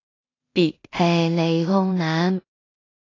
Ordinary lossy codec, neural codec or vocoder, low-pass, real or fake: AAC, 32 kbps; codec, 16 kHz in and 24 kHz out, 0.4 kbps, LongCat-Audio-Codec, two codebook decoder; 7.2 kHz; fake